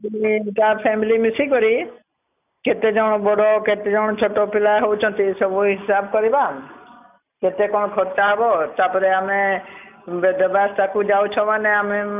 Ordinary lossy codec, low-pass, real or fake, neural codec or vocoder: none; 3.6 kHz; real; none